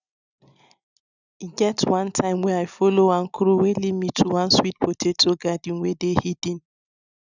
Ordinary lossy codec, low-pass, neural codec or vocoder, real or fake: none; 7.2 kHz; none; real